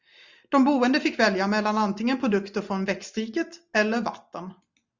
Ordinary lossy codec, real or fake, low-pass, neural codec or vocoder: Opus, 64 kbps; real; 7.2 kHz; none